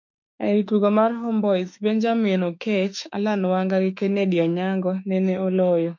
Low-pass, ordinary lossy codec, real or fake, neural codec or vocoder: 7.2 kHz; MP3, 48 kbps; fake; autoencoder, 48 kHz, 32 numbers a frame, DAC-VAE, trained on Japanese speech